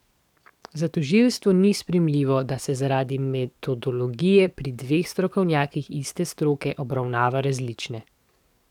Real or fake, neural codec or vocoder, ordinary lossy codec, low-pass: fake; codec, 44.1 kHz, 7.8 kbps, DAC; none; 19.8 kHz